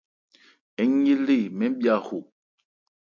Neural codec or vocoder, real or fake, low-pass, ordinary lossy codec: none; real; 7.2 kHz; MP3, 48 kbps